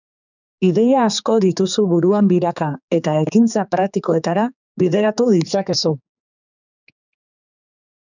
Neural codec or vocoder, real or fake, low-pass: codec, 16 kHz, 4 kbps, X-Codec, HuBERT features, trained on general audio; fake; 7.2 kHz